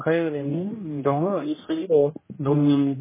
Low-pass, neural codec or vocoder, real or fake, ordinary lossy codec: 3.6 kHz; codec, 16 kHz, 1 kbps, X-Codec, HuBERT features, trained on general audio; fake; MP3, 16 kbps